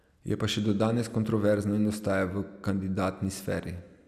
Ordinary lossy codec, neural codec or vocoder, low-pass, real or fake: none; none; 14.4 kHz; real